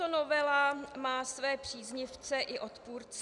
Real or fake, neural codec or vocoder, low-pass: real; none; 10.8 kHz